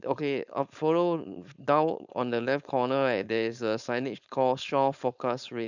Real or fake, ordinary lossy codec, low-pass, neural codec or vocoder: fake; none; 7.2 kHz; codec, 16 kHz, 4.8 kbps, FACodec